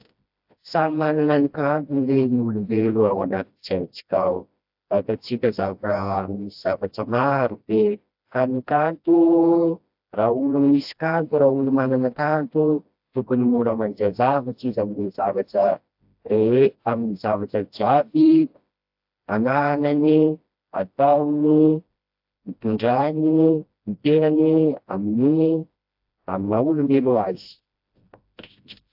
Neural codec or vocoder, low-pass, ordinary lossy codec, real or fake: codec, 16 kHz, 1 kbps, FreqCodec, smaller model; 5.4 kHz; AAC, 48 kbps; fake